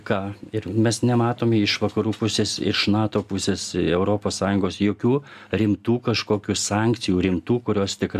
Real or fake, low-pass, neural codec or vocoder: real; 14.4 kHz; none